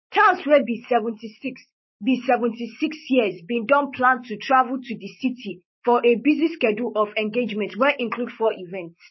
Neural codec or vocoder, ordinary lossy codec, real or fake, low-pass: codec, 16 kHz, 6 kbps, DAC; MP3, 24 kbps; fake; 7.2 kHz